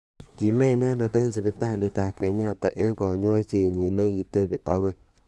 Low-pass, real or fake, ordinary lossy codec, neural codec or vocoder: none; fake; none; codec, 24 kHz, 1 kbps, SNAC